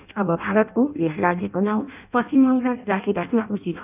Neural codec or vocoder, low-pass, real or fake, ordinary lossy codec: codec, 16 kHz in and 24 kHz out, 0.6 kbps, FireRedTTS-2 codec; 3.6 kHz; fake; none